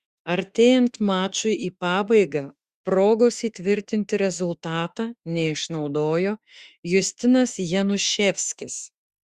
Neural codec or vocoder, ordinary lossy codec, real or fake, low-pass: autoencoder, 48 kHz, 32 numbers a frame, DAC-VAE, trained on Japanese speech; Opus, 64 kbps; fake; 14.4 kHz